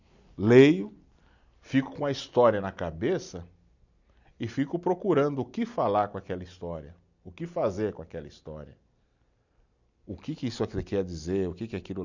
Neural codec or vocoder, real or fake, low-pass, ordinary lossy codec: none; real; 7.2 kHz; none